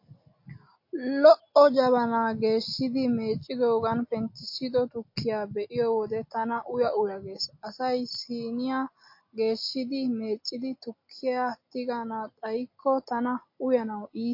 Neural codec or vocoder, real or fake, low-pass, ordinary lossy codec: none; real; 5.4 kHz; MP3, 32 kbps